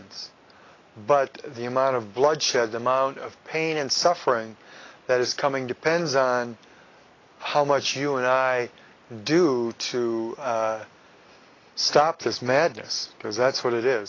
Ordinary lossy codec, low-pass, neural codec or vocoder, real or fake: AAC, 32 kbps; 7.2 kHz; none; real